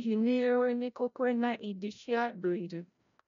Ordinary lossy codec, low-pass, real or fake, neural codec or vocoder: none; 7.2 kHz; fake; codec, 16 kHz, 0.5 kbps, FreqCodec, larger model